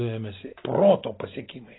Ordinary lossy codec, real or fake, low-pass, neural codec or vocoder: AAC, 16 kbps; real; 7.2 kHz; none